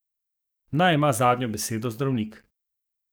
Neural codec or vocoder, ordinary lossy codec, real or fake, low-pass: codec, 44.1 kHz, 7.8 kbps, DAC; none; fake; none